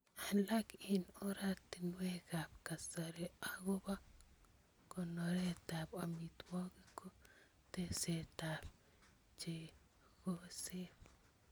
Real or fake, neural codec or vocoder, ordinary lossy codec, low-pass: real; none; none; none